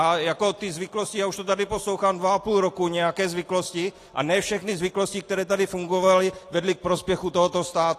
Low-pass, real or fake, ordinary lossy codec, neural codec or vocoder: 14.4 kHz; real; AAC, 48 kbps; none